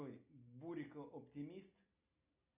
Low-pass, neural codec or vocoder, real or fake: 3.6 kHz; none; real